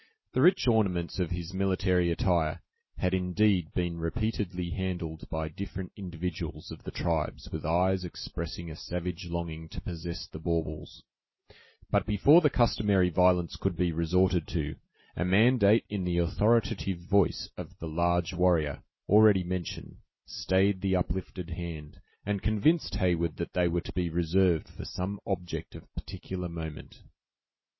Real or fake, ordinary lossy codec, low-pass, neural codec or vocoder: real; MP3, 24 kbps; 7.2 kHz; none